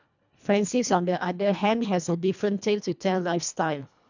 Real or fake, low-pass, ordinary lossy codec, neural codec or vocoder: fake; 7.2 kHz; none; codec, 24 kHz, 1.5 kbps, HILCodec